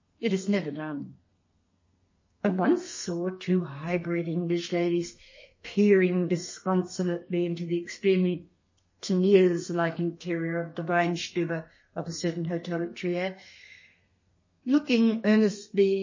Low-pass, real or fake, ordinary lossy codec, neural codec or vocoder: 7.2 kHz; fake; MP3, 32 kbps; codec, 32 kHz, 1.9 kbps, SNAC